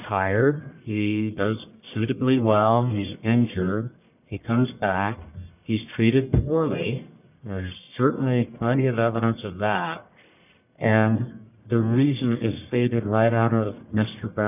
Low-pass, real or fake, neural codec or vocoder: 3.6 kHz; fake; codec, 44.1 kHz, 1.7 kbps, Pupu-Codec